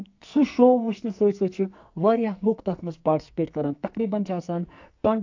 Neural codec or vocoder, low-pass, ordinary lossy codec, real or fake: codec, 44.1 kHz, 2.6 kbps, SNAC; 7.2 kHz; AAC, 48 kbps; fake